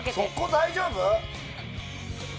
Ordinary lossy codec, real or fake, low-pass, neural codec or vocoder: none; real; none; none